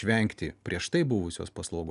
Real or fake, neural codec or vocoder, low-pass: real; none; 10.8 kHz